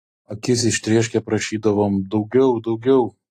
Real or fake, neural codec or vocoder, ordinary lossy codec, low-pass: real; none; AAC, 48 kbps; 14.4 kHz